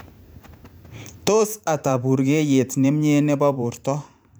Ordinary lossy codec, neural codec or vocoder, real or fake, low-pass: none; vocoder, 44.1 kHz, 128 mel bands every 512 samples, BigVGAN v2; fake; none